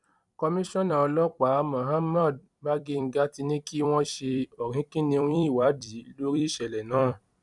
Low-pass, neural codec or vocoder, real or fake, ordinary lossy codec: 10.8 kHz; vocoder, 44.1 kHz, 128 mel bands every 256 samples, BigVGAN v2; fake; none